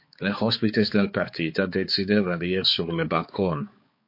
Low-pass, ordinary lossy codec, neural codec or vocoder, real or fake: 5.4 kHz; MP3, 32 kbps; codec, 16 kHz, 4 kbps, X-Codec, HuBERT features, trained on balanced general audio; fake